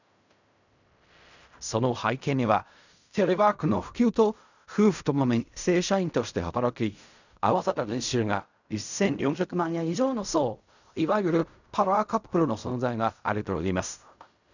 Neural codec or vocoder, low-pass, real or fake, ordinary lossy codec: codec, 16 kHz in and 24 kHz out, 0.4 kbps, LongCat-Audio-Codec, fine tuned four codebook decoder; 7.2 kHz; fake; none